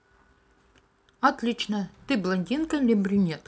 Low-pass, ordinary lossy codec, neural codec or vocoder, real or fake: none; none; none; real